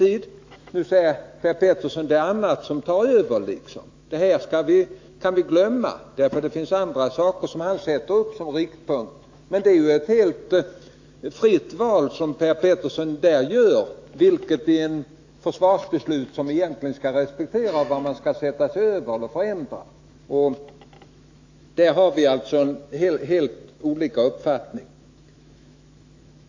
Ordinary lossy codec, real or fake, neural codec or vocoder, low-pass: AAC, 48 kbps; fake; autoencoder, 48 kHz, 128 numbers a frame, DAC-VAE, trained on Japanese speech; 7.2 kHz